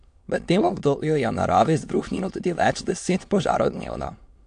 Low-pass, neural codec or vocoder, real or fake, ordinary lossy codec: 9.9 kHz; autoencoder, 22.05 kHz, a latent of 192 numbers a frame, VITS, trained on many speakers; fake; AAC, 64 kbps